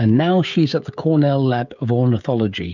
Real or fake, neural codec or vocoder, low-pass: fake; codec, 16 kHz, 16 kbps, FreqCodec, smaller model; 7.2 kHz